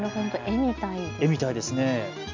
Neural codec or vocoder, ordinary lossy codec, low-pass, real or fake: none; MP3, 64 kbps; 7.2 kHz; real